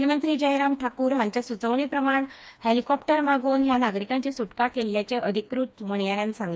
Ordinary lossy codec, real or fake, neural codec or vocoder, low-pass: none; fake; codec, 16 kHz, 2 kbps, FreqCodec, smaller model; none